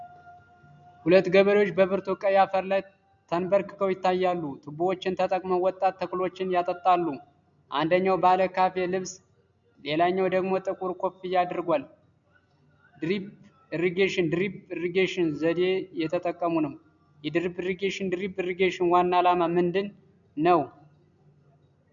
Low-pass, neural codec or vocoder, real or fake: 7.2 kHz; none; real